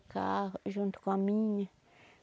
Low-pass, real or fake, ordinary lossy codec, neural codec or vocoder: none; real; none; none